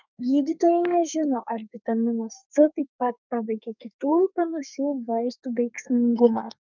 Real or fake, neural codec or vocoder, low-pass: fake; codec, 32 kHz, 1.9 kbps, SNAC; 7.2 kHz